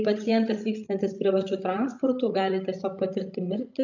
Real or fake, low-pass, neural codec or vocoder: fake; 7.2 kHz; codec, 16 kHz, 16 kbps, FreqCodec, larger model